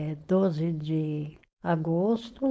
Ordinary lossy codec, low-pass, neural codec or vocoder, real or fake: none; none; codec, 16 kHz, 4.8 kbps, FACodec; fake